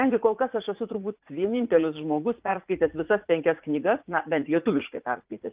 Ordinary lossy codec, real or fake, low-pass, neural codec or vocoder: Opus, 24 kbps; fake; 3.6 kHz; vocoder, 44.1 kHz, 80 mel bands, Vocos